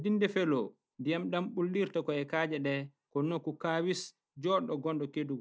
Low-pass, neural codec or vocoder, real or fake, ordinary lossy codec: none; none; real; none